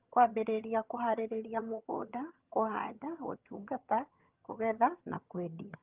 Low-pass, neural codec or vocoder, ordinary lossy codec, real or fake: 3.6 kHz; vocoder, 22.05 kHz, 80 mel bands, HiFi-GAN; Opus, 32 kbps; fake